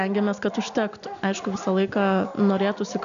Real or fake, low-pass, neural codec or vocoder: real; 7.2 kHz; none